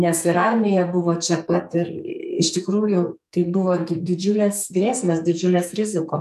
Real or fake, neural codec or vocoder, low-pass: fake; codec, 44.1 kHz, 2.6 kbps, SNAC; 14.4 kHz